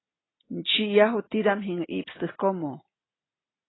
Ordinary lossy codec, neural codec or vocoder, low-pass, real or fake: AAC, 16 kbps; none; 7.2 kHz; real